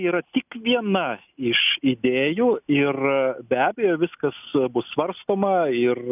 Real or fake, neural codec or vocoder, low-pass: real; none; 3.6 kHz